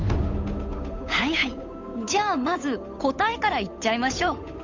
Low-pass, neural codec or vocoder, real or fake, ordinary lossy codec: 7.2 kHz; codec, 16 kHz, 8 kbps, FunCodec, trained on Chinese and English, 25 frames a second; fake; MP3, 64 kbps